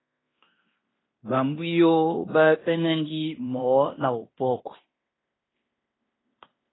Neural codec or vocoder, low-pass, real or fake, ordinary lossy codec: codec, 16 kHz in and 24 kHz out, 0.9 kbps, LongCat-Audio-Codec, fine tuned four codebook decoder; 7.2 kHz; fake; AAC, 16 kbps